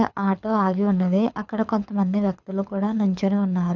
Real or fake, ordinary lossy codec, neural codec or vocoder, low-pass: fake; none; codec, 24 kHz, 6 kbps, HILCodec; 7.2 kHz